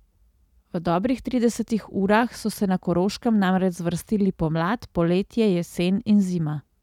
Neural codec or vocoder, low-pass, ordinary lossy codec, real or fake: none; 19.8 kHz; none; real